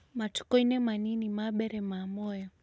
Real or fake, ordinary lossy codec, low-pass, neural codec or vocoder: real; none; none; none